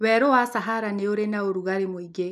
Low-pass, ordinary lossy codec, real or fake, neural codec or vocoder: 14.4 kHz; none; real; none